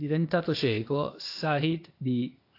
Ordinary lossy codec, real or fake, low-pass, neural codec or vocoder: AAC, 32 kbps; fake; 5.4 kHz; codec, 16 kHz, 0.8 kbps, ZipCodec